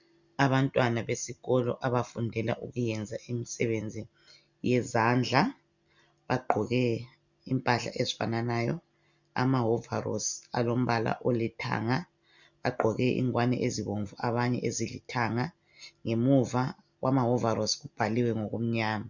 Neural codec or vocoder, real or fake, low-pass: none; real; 7.2 kHz